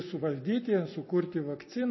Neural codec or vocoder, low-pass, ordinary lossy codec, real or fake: none; 7.2 kHz; MP3, 24 kbps; real